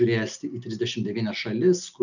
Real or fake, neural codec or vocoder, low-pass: real; none; 7.2 kHz